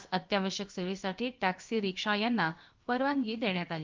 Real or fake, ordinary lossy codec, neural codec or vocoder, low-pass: fake; Opus, 32 kbps; codec, 16 kHz, about 1 kbps, DyCAST, with the encoder's durations; 7.2 kHz